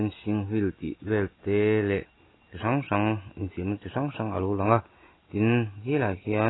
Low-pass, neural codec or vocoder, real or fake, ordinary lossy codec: 7.2 kHz; none; real; AAC, 16 kbps